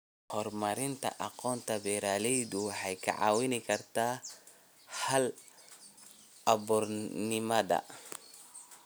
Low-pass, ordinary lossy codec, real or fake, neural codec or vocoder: none; none; real; none